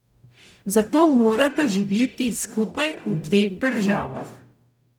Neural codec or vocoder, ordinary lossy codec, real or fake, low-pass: codec, 44.1 kHz, 0.9 kbps, DAC; none; fake; 19.8 kHz